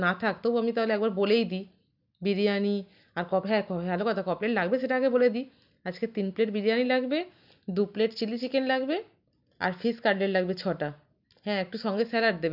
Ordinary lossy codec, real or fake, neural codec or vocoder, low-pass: none; real; none; 5.4 kHz